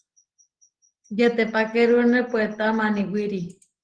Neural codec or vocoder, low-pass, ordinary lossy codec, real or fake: none; 9.9 kHz; Opus, 16 kbps; real